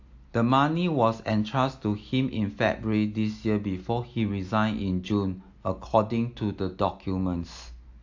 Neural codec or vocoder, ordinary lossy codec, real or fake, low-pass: none; AAC, 48 kbps; real; 7.2 kHz